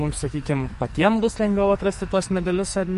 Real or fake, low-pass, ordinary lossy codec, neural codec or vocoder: fake; 14.4 kHz; MP3, 48 kbps; codec, 44.1 kHz, 2.6 kbps, SNAC